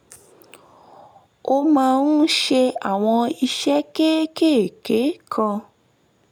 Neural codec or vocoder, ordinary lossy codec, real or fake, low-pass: none; none; real; 19.8 kHz